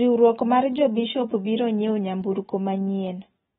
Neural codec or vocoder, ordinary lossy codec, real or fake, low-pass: none; AAC, 16 kbps; real; 7.2 kHz